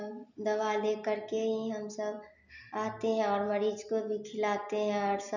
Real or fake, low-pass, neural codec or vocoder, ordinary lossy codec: real; 7.2 kHz; none; none